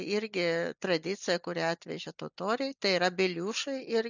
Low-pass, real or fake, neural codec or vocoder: 7.2 kHz; real; none